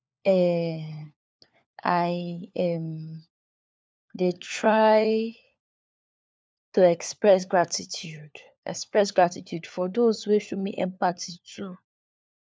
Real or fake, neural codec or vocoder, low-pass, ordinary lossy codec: fake; codec, 16 kHz, 4 kbps, FunCodec, trained on LibriTTS, 50 frames a second; none; none